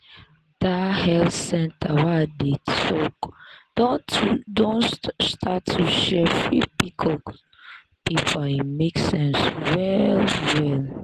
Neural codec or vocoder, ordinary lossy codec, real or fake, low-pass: none; Opus, 16 kbps; real; 14.4 kHz